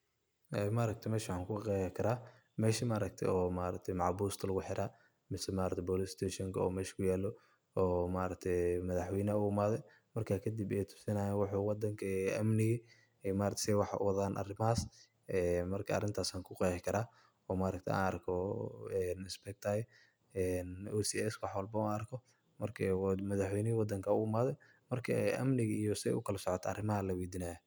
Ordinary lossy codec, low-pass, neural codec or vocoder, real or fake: none; none; none; real